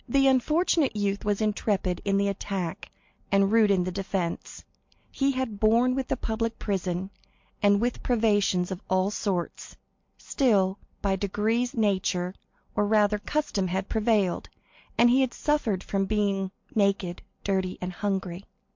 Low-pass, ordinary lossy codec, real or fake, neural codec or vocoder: 7.2 kHz; MP3, 48 kbps; real; none